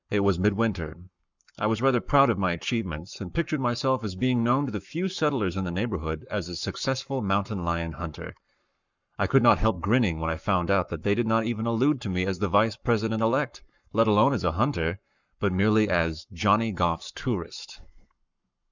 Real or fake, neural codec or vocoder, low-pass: fake; codec, 44.1 kHz, 7.8 kbps, Pupu-Codec; 7.2 kHz